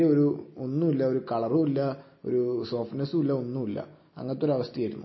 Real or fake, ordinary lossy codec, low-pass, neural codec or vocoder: real; MP3, 24 kbps; 7.2 kHz; none